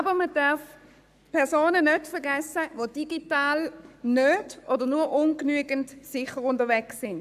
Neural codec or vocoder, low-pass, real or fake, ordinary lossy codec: codec, 44.1 kHz, 7.8 kbps, Pupu-Codec; 14.4 kHz; fake; AAC, 96 kbps